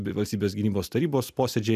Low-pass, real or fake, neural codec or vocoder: 14.4 kHz; real; none